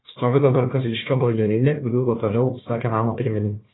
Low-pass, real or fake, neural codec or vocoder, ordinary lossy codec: 7.2 kHz; fake; codec, 16 kHz, 1 kbps, FunCodec, trained on Chinese and English, 50 frames a second; AAC, 16 kbps